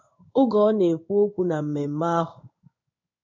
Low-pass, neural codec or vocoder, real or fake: 7.2 kHz; codec, 16 kHz in and 24 kHz out, 1 kbps, XY-Tokenizer; fake